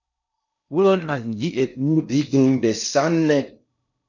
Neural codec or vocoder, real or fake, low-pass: codec, 16 kHz in and 24 kHz out, 0.6 kbps, FocalCodec, streaming, 4096 codes; fake; 7.2 kHz